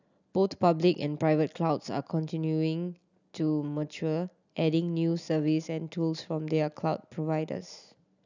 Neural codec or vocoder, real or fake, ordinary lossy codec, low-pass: none; real; none; 7.2 kHz